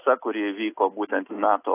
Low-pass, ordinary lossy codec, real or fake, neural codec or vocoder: 3.6 kHz; AAC, 24 kbps; real; none